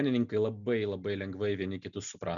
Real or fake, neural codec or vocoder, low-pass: real; none; 7.2 kHz